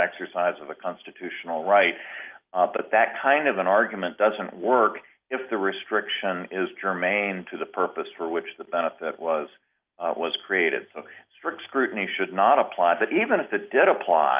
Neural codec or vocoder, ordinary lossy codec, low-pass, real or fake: none; Opus, 24 kbps; 3.6 kHz; real